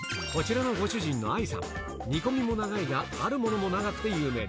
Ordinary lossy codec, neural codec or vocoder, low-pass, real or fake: none; none; none; real